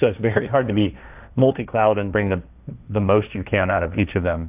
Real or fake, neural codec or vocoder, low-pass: fake; codec, 16 kHz, 1.1 kbps, Voila-Tokenizer; 3.6 kHz